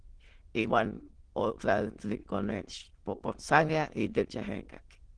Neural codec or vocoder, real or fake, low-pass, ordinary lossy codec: autoencoder, 22.05 kHz, a latent of 192 numbers a frame, VITS, trained on many speakers; fake; 9.9 kHz; Opus, 16 kbps